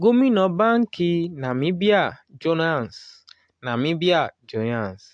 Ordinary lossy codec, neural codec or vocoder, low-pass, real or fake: AAC, 64 kbps; none; 9.9 kHz; real